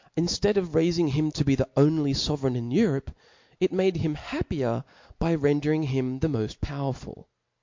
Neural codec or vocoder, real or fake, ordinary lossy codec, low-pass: none; real; MP3, 48 kbps; 7.2 kHz